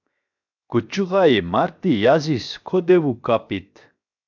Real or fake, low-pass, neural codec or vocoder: fake; 7.2 kHz; codec, 16 kHz, 0.7 kbps, FocalCodec